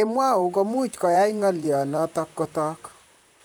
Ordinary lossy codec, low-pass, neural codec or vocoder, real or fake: none; none; vocoder, 44.1 kHz, 128 mel bands, Pupu-Vocoder; fake